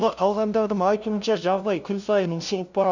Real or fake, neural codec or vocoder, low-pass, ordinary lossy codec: fake; codec, 16 kHz, 0.5 kbps, FunCodec, trained on LibriTTS, 25 frames a second; 7.2 kHz; none